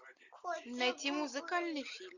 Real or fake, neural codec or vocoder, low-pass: real; none; 7.2 kHz